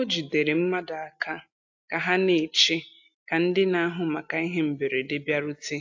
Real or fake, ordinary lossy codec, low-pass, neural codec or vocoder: real; AAC, 48 kbps; 7.2 kHz; none